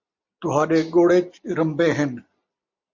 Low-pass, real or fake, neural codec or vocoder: 7.2 kHz; real; none